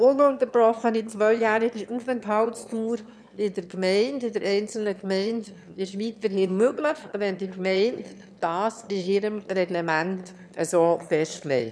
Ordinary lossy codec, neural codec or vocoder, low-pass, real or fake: none; autoencoder, 22.05 kHz, a latent of 192 numbers a frame, VITS, trained on one speaker; none; fake